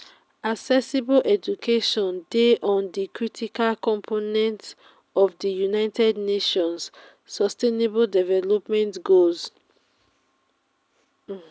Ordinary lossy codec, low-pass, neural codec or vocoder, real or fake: none; none; none; real